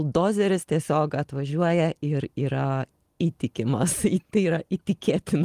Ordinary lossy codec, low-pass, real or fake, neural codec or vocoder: Opus, 24 kbps; 14.4 kHz; real; none